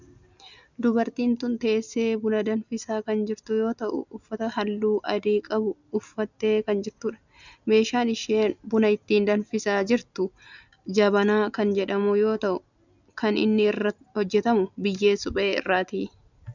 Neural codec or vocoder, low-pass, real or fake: none; 7.2 kHz; real